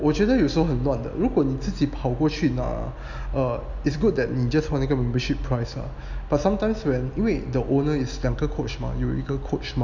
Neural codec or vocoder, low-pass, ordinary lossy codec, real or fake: none; 7.2 kHz; none; real